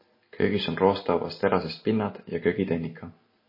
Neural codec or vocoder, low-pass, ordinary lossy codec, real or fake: none; 5.4 kHz; MP3, 24 kbps; real